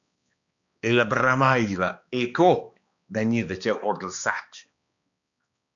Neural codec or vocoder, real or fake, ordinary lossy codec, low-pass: codec, 16 kHz, 2 kbps, X-Codec, HuBERT features, trained on balanced general audio; fake; MP3, 96 kbps; 7.2 kHz